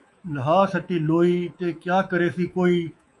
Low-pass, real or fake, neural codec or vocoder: 10.8 kHz; fake; codec, 24 kHz, 3.1 kbps, DualCodec